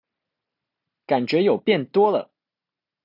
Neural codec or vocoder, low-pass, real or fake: none; 5.4 kHz; real